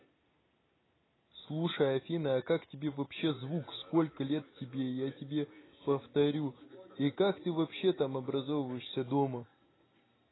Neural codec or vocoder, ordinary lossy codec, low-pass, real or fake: none; AAC, 16 kbps; 7.2 kHz; real